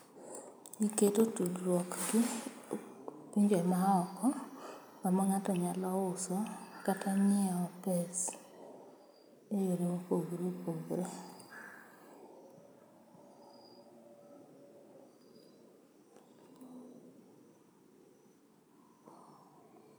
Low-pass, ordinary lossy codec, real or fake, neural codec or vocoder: none; none; real; none